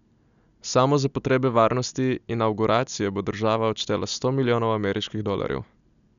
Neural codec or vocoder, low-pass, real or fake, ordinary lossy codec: none; 7.2 kHz; real; none